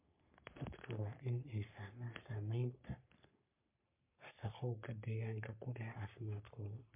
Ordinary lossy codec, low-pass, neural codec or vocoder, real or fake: MP3, 32 kbps; 3.6 kHz; codec, 44.1 kHz, 3.4 kbps, Pupu-Codec; fake